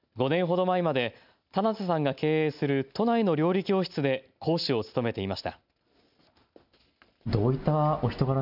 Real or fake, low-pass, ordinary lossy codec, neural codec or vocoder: real; 5.4 kHz; none; none